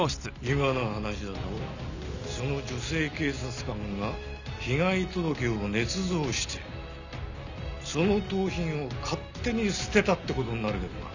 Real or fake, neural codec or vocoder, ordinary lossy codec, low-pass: real; none; none; 7.2 kHz